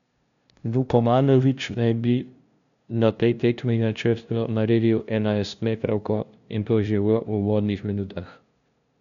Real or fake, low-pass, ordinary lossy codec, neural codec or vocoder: fake; 7.2 kHz; none; codec, 16 kHz, 0.5 kbps, FunCodec, trained on LibriTTS, 25 frames a second